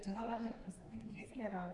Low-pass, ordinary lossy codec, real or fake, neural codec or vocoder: 10.8 kHz; AAC, 64 kbps; fake; codec, 24 kHz, 1 kbps, SNAC